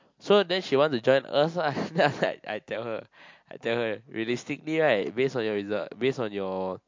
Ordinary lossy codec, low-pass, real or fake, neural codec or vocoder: MP3, 48 kbps; 7.2 kHz; real; none